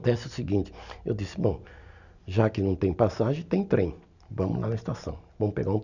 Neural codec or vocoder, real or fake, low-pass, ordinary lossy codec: none; real; 7.2 kHz; none